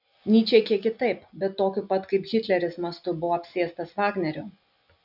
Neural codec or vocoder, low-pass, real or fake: none; 5.4 kHz; real